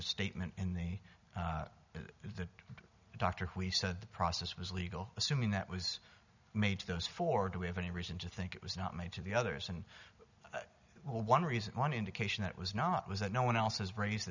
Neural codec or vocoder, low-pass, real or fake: none; 7.2 kHz; real